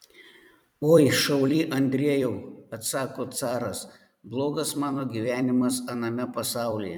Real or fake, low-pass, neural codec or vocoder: fake; 19.8 kHz; vocoder, 44.1 kHz, 128 mel bands every 256 samples, BigVGAN v2